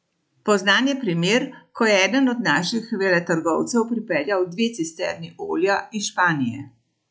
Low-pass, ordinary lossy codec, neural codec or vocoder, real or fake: none; none; none; real